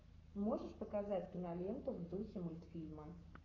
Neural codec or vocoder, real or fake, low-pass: codec, 44.1 kHz, 7.8 kbps, Pupu-Codec; fake; 7.2 kHz